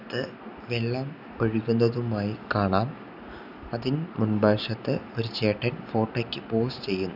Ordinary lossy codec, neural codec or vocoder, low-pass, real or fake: none; none; 5.4 kHz; real